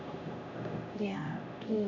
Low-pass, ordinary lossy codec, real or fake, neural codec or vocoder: 7.2 kHz; none; fake; codec, 16 kHz, 0.5 kbps, X-Codec, HuBERT features, trained on LibriSpeech